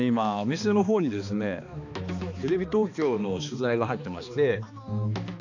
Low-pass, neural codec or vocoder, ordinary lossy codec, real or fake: 7.2 kHz; codec, 16 kHz, 2 kbps, X-Codec, HuBERT features, trained on balanced general audio; none; fake